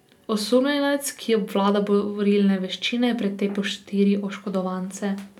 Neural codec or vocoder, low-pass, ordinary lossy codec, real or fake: none; 19.8 kHz; none; real